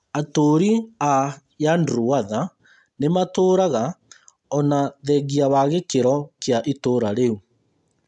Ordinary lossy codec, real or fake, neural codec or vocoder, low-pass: MP3, 96 kbps; real; none; 10.8 kHz